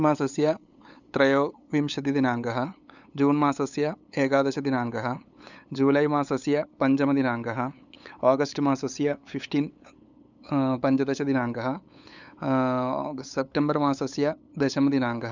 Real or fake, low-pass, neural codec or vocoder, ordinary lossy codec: fake; 7.2 kHz; codec, 16 kHz, 8 kbps, FunCodec, trained on LibriTTS, 25 frames a second; none